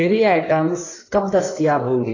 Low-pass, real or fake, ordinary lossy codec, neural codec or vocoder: 7.2 kHz; fake; AAC, 32 kbps; codec, 16 kHz in and 24 kHz out, 1.1 kbps, FireRedTTS-2 codec